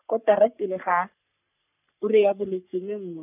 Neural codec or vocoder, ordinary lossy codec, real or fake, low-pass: codec, 44.1 kHz, 3.4 kbps, Pupu-Codec; none; fake; 3.6 kHz